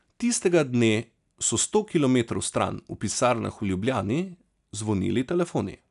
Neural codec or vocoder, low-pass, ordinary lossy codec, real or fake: none; 10.8 kHz; none; real